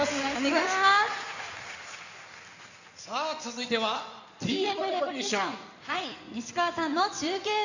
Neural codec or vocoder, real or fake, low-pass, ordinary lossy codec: codec, 16 kHz in and 24 kHz out, 2.2 kbps, FireRedTTS-2 codec; fake; 7.2 kHz; none